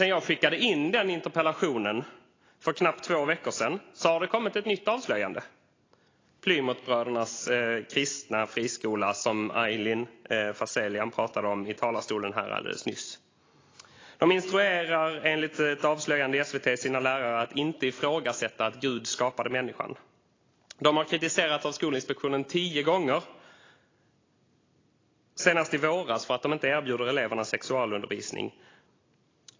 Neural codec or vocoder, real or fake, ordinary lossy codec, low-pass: none; real; AAC, 32 kbps; 7.2 kHz